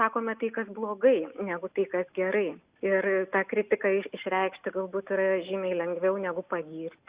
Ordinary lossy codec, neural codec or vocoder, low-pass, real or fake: Opus, 64 kbps; none; 3.6 kHz; real